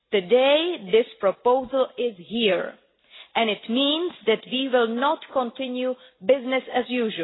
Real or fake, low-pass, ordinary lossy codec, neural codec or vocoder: real; 7.2 kHz; AAC, 16 kbps; none